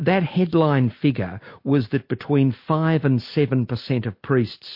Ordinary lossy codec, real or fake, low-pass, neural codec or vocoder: MP3, 32 kbps; real; 5.4 kHz; none